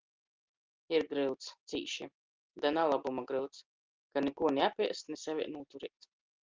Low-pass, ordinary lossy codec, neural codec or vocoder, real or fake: 7.2 kHz; Opus, 24 kbps; none; real